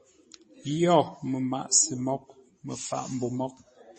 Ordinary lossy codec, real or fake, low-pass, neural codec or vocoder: MP3, 32 kbps; fake; 10.8 kHz; vocoder, 44.1 kHz, 128 mel bands every 512 samples, BigVGAN v2